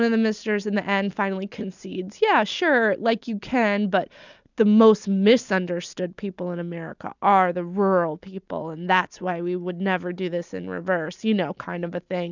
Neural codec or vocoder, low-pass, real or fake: none; 7.2 kHz; real